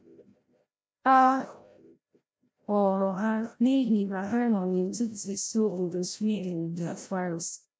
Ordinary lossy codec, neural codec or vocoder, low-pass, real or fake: none; codec, 16 kHz, 0.5 kbps, FreqCodec, larger model; none; fake